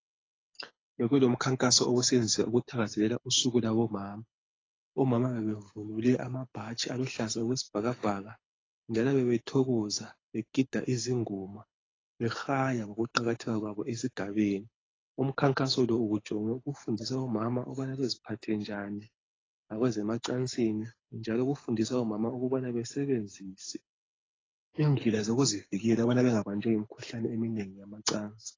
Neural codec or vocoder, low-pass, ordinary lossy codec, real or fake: codec, 24 kHz, 6 kbps, HILCodec; 7.2 kHz; AAC, 32 kbps; fake